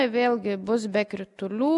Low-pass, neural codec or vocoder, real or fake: 10.8 kHz; none; real